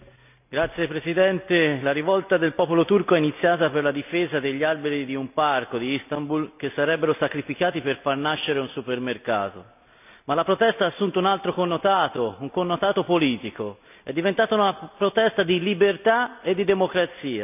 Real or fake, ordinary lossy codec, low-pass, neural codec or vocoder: real; none; 3.6 kHz; none